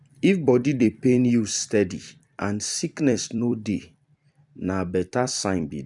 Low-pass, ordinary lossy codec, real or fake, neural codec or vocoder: 10.8 kHz; none; fake; vocoder, 44.1 kHz, 128 mel bands every 256 samples, BigVGAN v2